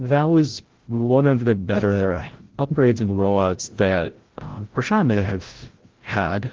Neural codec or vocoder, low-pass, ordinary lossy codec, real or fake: codec, 16 kHz, 0.5 kbps, FreqCodec, larger model; 7.2 kHz; Opus, 16 kbps; fake